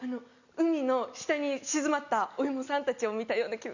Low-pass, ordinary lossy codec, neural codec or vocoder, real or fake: 7.2 kHz; none; none; real